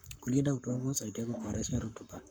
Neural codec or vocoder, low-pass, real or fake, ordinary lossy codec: codec, 44.1 kHz, 7.8 kbps, Pupu-Codec; none; fake; none